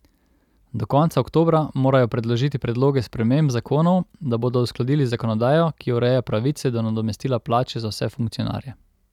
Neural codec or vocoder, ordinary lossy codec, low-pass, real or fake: none; none; 19.8 kHz; real